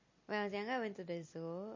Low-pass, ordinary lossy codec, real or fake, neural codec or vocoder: 7.2 kHz; MP3, 32 kbps; real; none